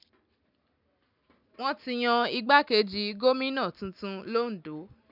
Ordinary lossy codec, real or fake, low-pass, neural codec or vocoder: none; real; 5.4 kHz; none